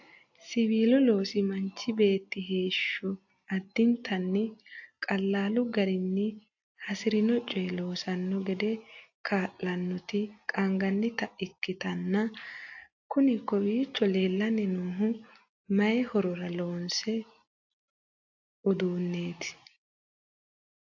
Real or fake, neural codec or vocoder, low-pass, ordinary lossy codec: real; none; 7.2 kHz; AAC, 48 kbps